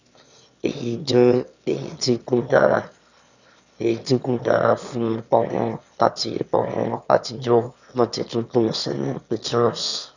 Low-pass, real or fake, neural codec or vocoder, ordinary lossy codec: 7.2 kHz; fake; autoencoder, 22.05 kHz, a latent of 192 numbers a frame, VITS, trained on one speaker; none